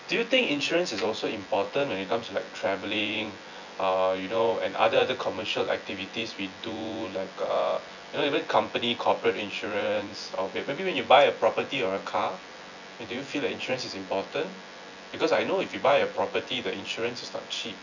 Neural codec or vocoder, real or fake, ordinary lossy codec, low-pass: vocoder, 24 kHz, 100 mel bands, Vocos; fake; none; 7.2 kHz